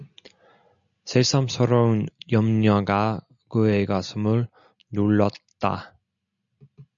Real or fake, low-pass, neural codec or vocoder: real; 7.2 kHz; none